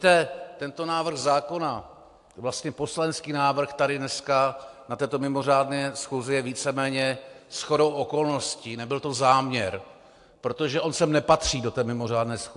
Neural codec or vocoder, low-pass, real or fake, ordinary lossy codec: none; 10.8 kHz; real; AAC, 64 kbps